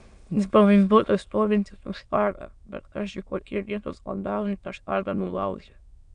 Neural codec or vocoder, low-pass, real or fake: autoencoder, 22.05 kHz, a latent of 192 numbers a frame, VITS, trained on many speakers; 9.9 kHz; fake